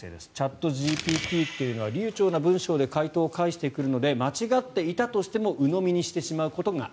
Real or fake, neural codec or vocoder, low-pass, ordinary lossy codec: real; none; none; none